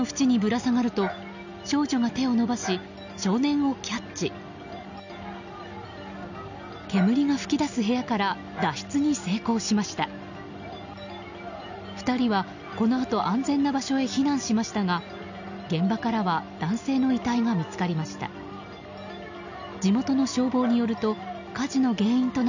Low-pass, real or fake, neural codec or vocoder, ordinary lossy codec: 7.2 kHz; real; none; none